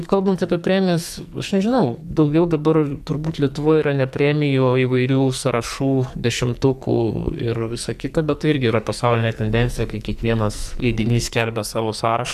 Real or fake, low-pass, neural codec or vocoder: fake; 14.4 kHz; codec, 44.1 kHz, 2.6 kbps, SNAC